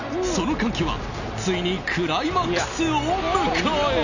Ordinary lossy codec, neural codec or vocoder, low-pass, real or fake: none; none; 7.2 kHz; real